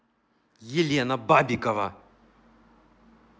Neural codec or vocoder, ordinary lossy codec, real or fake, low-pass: none; none; real; none